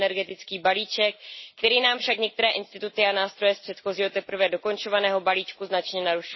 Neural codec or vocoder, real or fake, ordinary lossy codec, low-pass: none; real; MP3, 24 kbps; 7.2 kHz